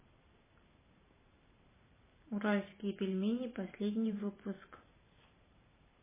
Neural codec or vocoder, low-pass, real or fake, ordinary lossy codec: vocoder, 44.1 kHz, 128 mel bands every 512 samples, BigVGAN v2; 3.6 kHz; fake; MP3, 16 kbps